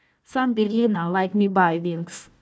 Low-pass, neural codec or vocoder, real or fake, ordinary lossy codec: none; codec, 16 kHz, 1 kbps, FunCodec, trained on Chinese and English, 50 frames a second; fake; none